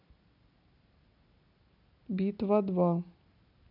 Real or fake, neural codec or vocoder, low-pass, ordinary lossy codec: real; none; 5.4 kHz; none